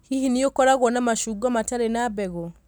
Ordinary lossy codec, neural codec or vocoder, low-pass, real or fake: none; none; none; real